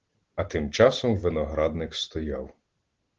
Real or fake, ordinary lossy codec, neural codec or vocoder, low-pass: real; Opus, 16 kbps; none; 7.2 kHz